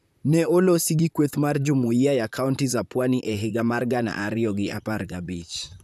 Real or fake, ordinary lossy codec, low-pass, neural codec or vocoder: fake; none; 14.4 kHz; vocoder, 44.1 kHz, 128 mel bands, Pupu-Vocoder